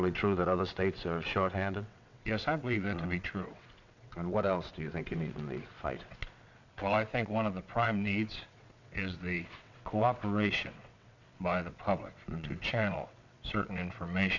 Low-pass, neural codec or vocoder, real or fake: 7.2 kHz; vocoder, 22.05 kHz, 80 mel bands, WaveNeXt; fake